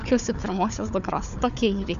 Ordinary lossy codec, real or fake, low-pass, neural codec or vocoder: MP3, 64 kbps; fake; 7.2 kHz; codec, 16 kHz, 4 kbps, FunCodec, trained on Chinese and English, 50 frames a second